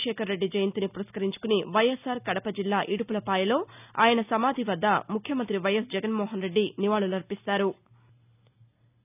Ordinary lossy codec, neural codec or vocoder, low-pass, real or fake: none; none; 3.6 kHz; real